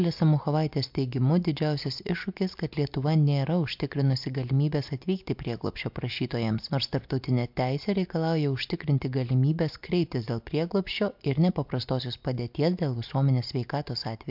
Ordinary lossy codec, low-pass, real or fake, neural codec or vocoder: MP3, 48 kbps; 5.4 kHz; real; none